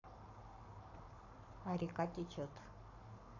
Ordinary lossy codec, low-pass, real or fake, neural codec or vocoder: none; 7.2 kHz; fake; codec, 44.1 kHz, 7.8 kbps, Pupu-Codec